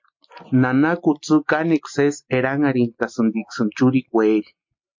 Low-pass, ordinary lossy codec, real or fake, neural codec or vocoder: 7.2 kHz; MP3, 48 kbps; real; none